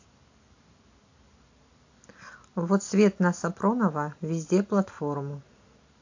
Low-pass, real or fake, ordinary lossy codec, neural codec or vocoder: 7.2 kHz; real; AAC, 48 kbps; none